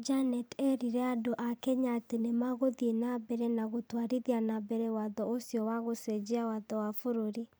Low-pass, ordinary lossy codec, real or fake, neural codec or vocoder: none; none; fake; vocoder, 44.1 kHz, 128 mel bands every 256 samples, BigVGAN v2